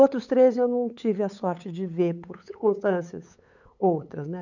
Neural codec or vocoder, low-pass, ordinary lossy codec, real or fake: codec, 16 kHz, 8 kbps, FunCodec, trained on LibriTTS, 25 frames a second; 7.2 kHz; none; fake